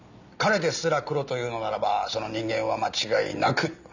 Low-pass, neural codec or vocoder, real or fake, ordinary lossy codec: 7.2 kHz; none; real; none